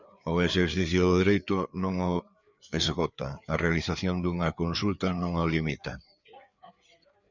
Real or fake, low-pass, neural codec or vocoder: fake; 7.2 kHz; codec, 16 kHz, 4 kbps, FreqCodec, larger model